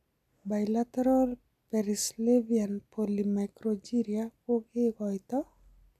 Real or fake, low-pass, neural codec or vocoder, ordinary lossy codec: real; 14.4 kHz; none; none